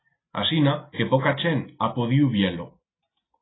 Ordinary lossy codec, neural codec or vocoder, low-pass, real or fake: AAC, 16 kbps; none; 7.2 kHz; real